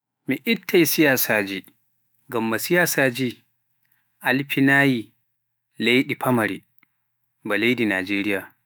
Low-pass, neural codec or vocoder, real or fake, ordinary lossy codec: none; autoencoder, 48 kHz, 128 numbers a frame, DAC-VAE, trained on Japanese speech; fake; none